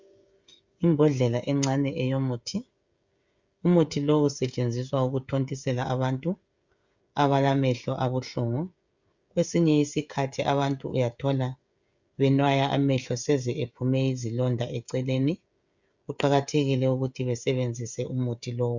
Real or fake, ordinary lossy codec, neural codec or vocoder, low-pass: fake; Opus, 64 kbps; codec, 16 kHz, 16 kbps, FreqCodec, smaller model; 7.2 kHz